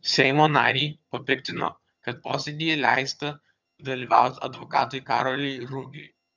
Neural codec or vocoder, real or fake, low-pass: vocoder, 22.05 kHz, 80 mel bands, HiFi-GAN; fake; 7.2 kHz